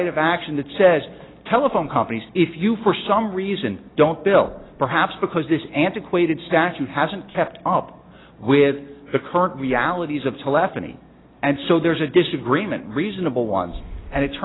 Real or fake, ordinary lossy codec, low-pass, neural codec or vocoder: real; AAC, 16 kbps; 7.2 kHz; none